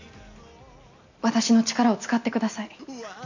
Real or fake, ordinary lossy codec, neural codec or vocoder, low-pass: real; none; none; 7.2 kHz